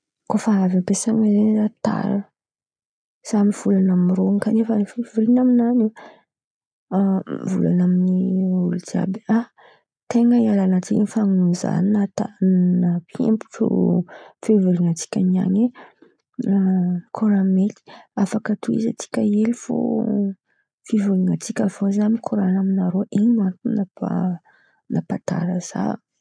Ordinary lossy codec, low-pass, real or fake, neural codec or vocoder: none; 9.9 kHz; real; none